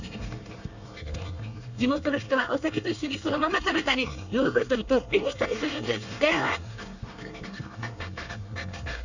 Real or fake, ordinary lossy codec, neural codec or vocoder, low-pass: fake; none; codec, 24 kHz, 1 kbps, SNAC; 7.2 kHz